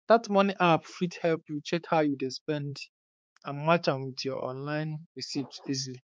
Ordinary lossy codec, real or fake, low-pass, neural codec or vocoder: none; fake; none; codec, 16 kHz, 4 kbps, X-Codec, HuBERT features, trained on balanced general audio